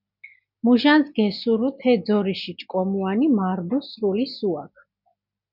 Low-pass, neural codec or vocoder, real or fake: 5.4 kHz; none; real